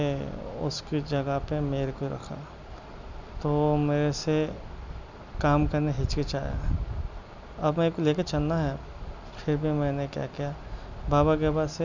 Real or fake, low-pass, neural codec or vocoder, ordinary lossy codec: real; 7.2 kHz; none; none